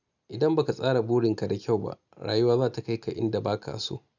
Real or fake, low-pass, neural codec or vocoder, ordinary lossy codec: real; 7.2 kHz; none; none